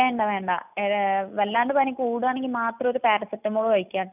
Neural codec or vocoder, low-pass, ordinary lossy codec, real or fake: none; 3.6 kHz; none; real